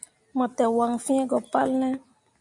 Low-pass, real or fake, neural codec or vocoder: 10.8 kHz; real; none